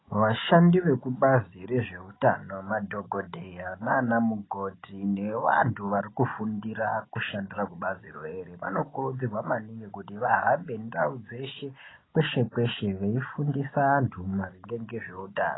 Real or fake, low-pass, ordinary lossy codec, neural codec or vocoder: real; 7.2 kHz; AAC, 16 kbps; none